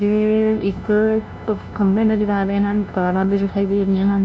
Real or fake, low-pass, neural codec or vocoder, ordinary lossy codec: fake; none; codec, 16 kHz, 0.5 kbps, FunCodec, trained on LibriTTS, 25 frames a second; none